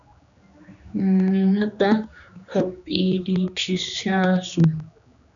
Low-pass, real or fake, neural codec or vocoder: 7.2 kHz; fake; codec, 16 kHz, 2 kbps, X-Codec, HuBERT features, trained on general audio